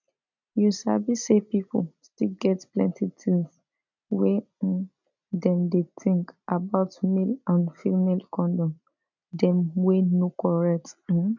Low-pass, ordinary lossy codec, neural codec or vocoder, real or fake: 7.2 kHz; none; none; real